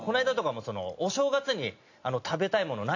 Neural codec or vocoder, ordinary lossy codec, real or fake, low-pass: none; AAC, 48 kbps; real; 7.2 kHz